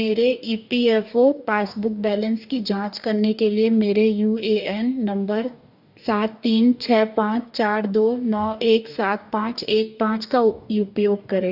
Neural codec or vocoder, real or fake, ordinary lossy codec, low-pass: codec, 44.1 kHz, 2.6 kbps, DAC; fake; none; 5.4 kHz